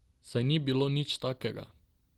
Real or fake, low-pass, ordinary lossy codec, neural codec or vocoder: real; 19.8 kHz; Opus, 16 kbps; none